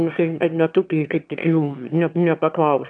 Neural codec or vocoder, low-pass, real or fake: autoencoder, 22.05 kHz, a latent of 192 numbers a frame, VITS, trained on one speaker; 9.9 kHz; fake